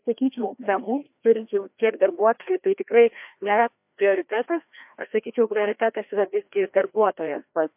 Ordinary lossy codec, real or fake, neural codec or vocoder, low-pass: MP3, 32 kbps; fake; codec, 16 kHz, 1 kbps, FreqCodec, larger model; 3.6 kHz